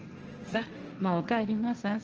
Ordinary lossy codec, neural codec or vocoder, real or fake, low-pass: Opus, 24 kbps; codec, 16 kHz, 1.1 kbps, Voila-Tokenizer; fake; 7.2 kHz